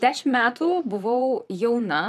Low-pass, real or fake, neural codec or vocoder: 14.4 kHz; fake; vocoder, 44.1 kHz, 128 mel bands, Pupu-Vocoder